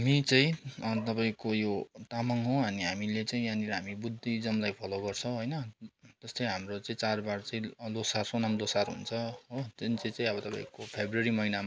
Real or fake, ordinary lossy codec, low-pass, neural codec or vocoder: real; none; none; none